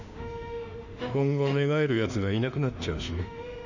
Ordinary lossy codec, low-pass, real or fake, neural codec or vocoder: none; 7.2 kHz; fake; autoencoder, 48 kHz, 32 numbers a frame, DAC-VAE, trained on Japanese speech